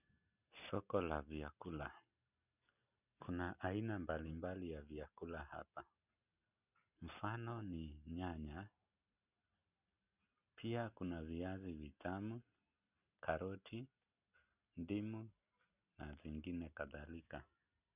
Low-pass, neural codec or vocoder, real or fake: 3.6 kHz; none; real